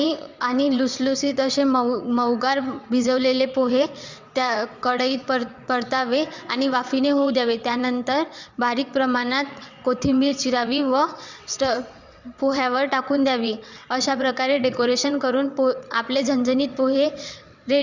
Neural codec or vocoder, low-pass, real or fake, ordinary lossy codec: vocoder, 22.05 kHz, 80 mel bands, WaveNeXt; 7.2 kHz; fake; Opus, 64 kbps